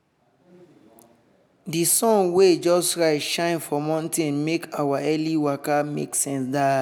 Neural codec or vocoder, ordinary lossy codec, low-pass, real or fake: none; none; none; real